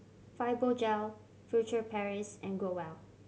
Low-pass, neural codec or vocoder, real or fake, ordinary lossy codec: none; none; real; none